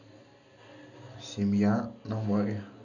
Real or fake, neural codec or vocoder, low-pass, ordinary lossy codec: real; none; 7.2 kHz; none